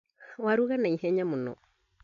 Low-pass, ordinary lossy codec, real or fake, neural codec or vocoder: 7.2 kHz; none; real; none